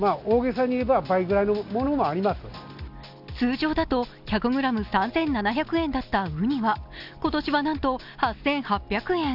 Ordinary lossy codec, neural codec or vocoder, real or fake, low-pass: none; none; real; 5.4 kHz